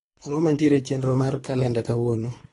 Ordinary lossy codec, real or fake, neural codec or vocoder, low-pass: AAC, 32 kbps; fake; codec, 24 kHz, 3 kbps, HILCodec; 10.8 kHz